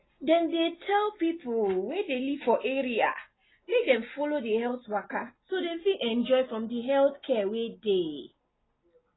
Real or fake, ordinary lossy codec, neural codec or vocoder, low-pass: real; AAC, 16 kbps; none; 7.2 kHz